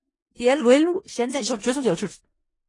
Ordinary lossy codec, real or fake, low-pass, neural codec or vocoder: AAC, 32 kbps; fake; 10.8 kHz; codec, 16 kHz in and 24 kHz out, 0.4 kbps, LongCat-Audio-Codec, four codebook decoder